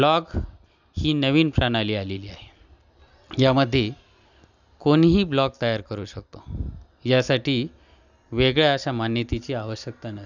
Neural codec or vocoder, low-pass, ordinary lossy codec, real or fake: none; 7.2 kHz; none; real